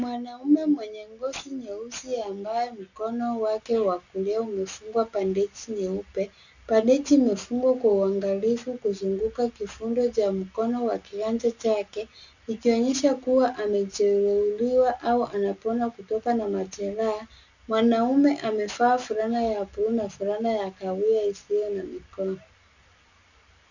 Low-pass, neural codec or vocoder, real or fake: 7.2 kHz; none; real